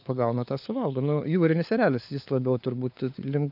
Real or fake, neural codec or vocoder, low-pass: fake; codec, 16 kHz, 4 kbps, FunCodec, trained on LibriTTS, 50 frames a second; 5.4 kHz